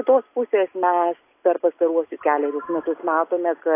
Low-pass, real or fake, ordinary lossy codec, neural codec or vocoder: 3.6 kHz; real; AAC, 24 kbps; none